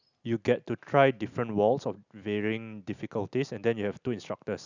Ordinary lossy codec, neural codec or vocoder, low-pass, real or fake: none; none; 7.2 kHz; real